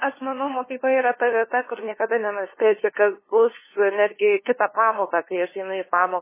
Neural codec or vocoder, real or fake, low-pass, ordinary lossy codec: codec, 16 kHz, 2 kbps, FunCodec, trained on LibriTTS, 25 frames a second; fake; 3.6 kHz; MP3, 16 kbps